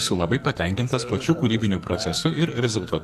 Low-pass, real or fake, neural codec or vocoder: 14.4 kHz; fake; codec, 44.1 kHz, 2.6 kbps, SNAC